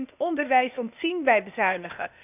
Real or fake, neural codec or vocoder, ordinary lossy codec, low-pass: fake; codec, 16 kHz, 0.8 kbps, ZipCodec; none; 3.6 kHz